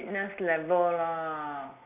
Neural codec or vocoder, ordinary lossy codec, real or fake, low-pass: none; Opus, 64 kbps; real; 3.6 kHz